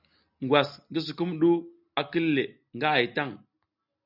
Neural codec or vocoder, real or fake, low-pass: none; real; 5.4 kHz